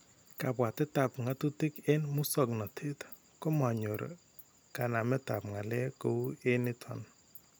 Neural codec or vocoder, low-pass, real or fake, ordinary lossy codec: none; none; real; none